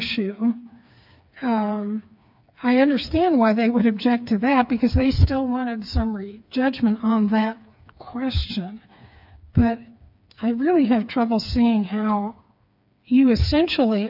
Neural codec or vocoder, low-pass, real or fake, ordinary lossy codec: codec, 16 kHz, 4 kbps, FreqCodec, smaller model; 5.4 kHz; fake; AAC, 48 kbps